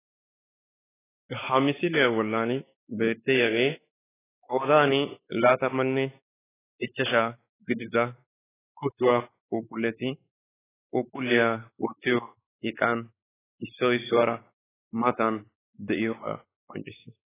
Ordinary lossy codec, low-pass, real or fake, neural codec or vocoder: AAC, 16 kbps; 3.6 kHz; fake; codec, 16 kHz, 4 kbps, X-Codec, WavLM features, trained on Multilingual LibriSpeech